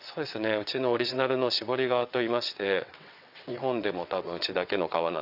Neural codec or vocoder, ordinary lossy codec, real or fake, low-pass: vocoder, 22.05 kHz, 80 mel bands, WaveNeXt; none; fake; 5.4 kHz